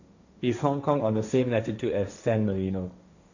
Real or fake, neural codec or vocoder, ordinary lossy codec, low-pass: fake; codec, 16 kHz, 1.1 kbps, Voila-Tokenizer; none; 7.2 kHz